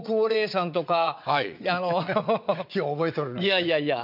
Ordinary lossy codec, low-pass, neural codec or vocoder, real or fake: none; 5.4 kHz; vocoder, 22.05 kHz, 80 mel bands, WaveNeXt; fake